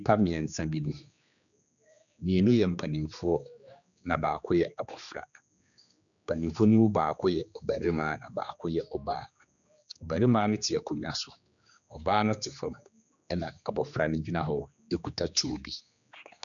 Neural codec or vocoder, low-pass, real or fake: codec, 16 kHz, 2 kbps, X-Codec, HuBERT features, trained on general audio; 7.2 kHz; fake